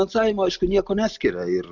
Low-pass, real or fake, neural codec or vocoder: 7.2 kHz; real; none